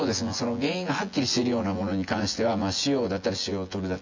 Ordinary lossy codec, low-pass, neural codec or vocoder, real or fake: MP3, 64 kbps; 7.2 kHz; vocoder, 24 kHz, 100 mel bands, Vocos; fake